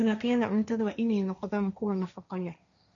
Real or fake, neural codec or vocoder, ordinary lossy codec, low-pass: fake; codec, 16 kHz, 1.1 kbps, Voila-Tokenizer; none; 7.2 kHz